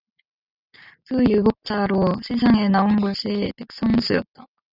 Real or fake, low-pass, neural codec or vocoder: real; 5.4 kHz; none